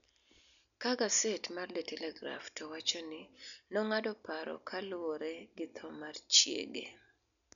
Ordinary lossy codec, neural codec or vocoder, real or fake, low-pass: none; none; real; 7.2 kHz